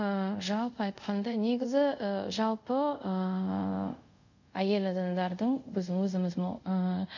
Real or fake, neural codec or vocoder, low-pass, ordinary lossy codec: fake; codec, 24 kHz, 0.5 kbps, DualCodec; 7.2 kHz; none